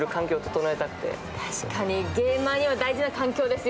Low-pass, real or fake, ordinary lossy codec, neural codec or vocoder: none; real; none; none